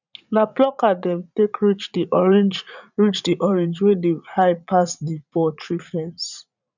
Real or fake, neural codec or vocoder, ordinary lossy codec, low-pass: fake; vocoder, 44.1 kHz, 80 mel bands, Vocos; none; 7.2 kHz